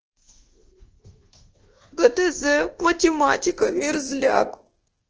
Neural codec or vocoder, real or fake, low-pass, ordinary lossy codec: codec, 24 kHz, 1.2 kbps, DualCodec; fake; 7.2 kHz; Opus, 16 kbps